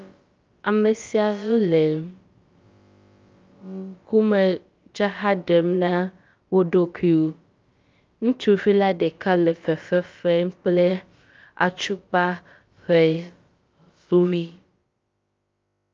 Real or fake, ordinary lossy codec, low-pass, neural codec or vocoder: fake; Opus, 24 kbps; 7.2 kHz; codec, 16 kHz, about 1 kbps, DyCAST, with the encoder's durations